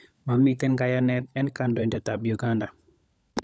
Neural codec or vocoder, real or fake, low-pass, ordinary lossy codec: codec, 16 kHz, 16 kbps, FunCodec, trained on LibriTTS, 50 frames a second; fake; none; none